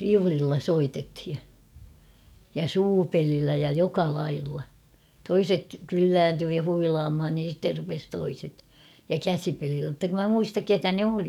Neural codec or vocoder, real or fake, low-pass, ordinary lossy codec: codec, 44.1 kHz, 7.8 kbps, DAC; fake; 19.8 kHz; none